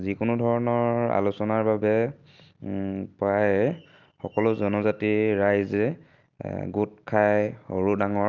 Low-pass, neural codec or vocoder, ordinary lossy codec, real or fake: 7.2 kHz; none; Opus, 24 kbps; real